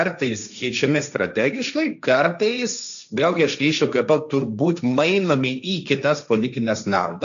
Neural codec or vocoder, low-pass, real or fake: codec, 16 kHz, 1.1 kbps, Voila-Tokenizer; 7.2 kHz; fake